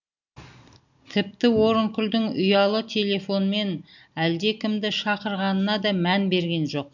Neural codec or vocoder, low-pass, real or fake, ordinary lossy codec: none; 7.2 kHz; real; none